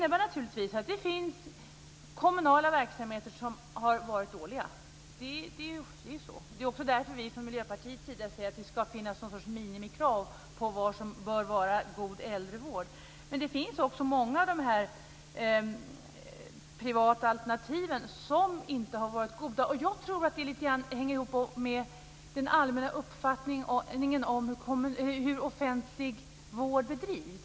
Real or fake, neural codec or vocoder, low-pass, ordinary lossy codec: real; none; none; none